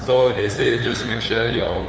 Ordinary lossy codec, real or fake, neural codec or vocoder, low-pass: none; fake; codec, 16 kHz, 2 kbps, FunCodec, trained on LibriTTS, 25 frames a second; none